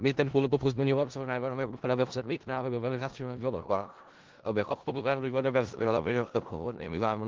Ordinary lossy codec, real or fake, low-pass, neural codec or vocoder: Opus, 16 kbps; fake; 7.2 kHz; codec, 16 kHz in and 24 kHz out, 0.4 kbps, LongCat-Audio-Codec, four codebook decoder